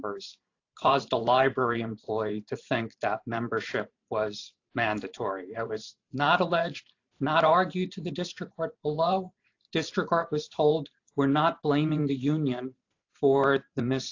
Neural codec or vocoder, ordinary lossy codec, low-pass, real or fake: vocoder, 44.1 kHz, 128 mel bands every 512 samples, BigVGAN v2; AAC, 48 kbps; 7.2 kHz; fake